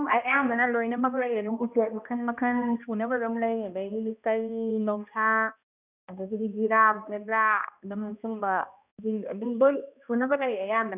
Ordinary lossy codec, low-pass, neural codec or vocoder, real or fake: none; 3.6 kHz; codec, 16 kHz, 1 kbps, X-Codec, HuBERT features, trained on balanced general audio; fake